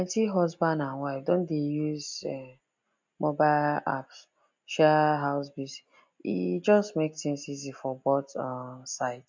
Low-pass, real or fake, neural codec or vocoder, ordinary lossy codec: 7.2 kHz; real; none; MP3, 64 kbps